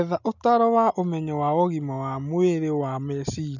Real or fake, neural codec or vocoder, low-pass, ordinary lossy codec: real; none; 7.2 kHz; none